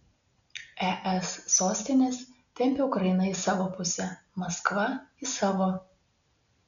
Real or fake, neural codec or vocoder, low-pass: real; none; 7.2 kHz